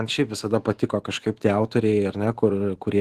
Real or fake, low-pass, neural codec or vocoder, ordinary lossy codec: fake; 14.4 kHz; autoencoder, 48 kHz, 128 numbers a frame, DAC-VAE, trained on Japanese speech; Opus, 16 kbps